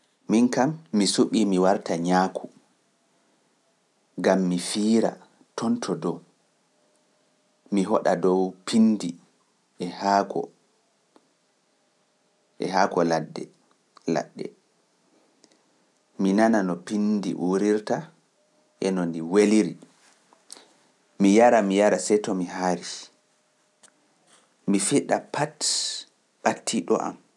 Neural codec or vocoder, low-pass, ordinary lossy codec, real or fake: none; none; none; real